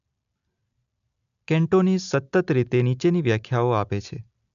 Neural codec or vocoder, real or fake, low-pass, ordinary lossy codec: none; real; 7.2 kHz; MP3, 96 kbps